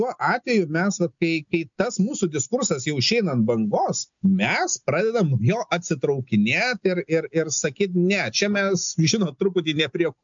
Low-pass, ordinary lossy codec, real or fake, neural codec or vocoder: 7.2 kHz; MP3, 64 kbps; real; none